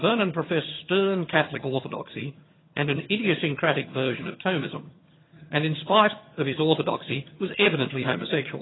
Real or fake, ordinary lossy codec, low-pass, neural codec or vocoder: fake; AAC, 16 kbps; 7.2 kHz; vocoder, 22.05 kHz, 80 mel bands, HiFi-GAN